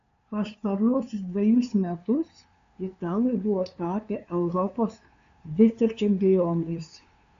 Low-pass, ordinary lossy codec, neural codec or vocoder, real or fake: 7.2 kHz; AAC, 64 kbps; codec, 16 kHz, 2 kbps, FunCodec, trained on LibriTTS, 25 frames a second; fake